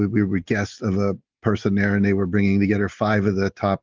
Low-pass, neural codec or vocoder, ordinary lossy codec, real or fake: 7.2 kHz; none; Opus, 16 kbps; real